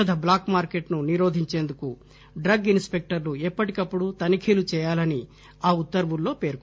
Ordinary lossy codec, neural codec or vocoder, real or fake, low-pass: none; none; real; none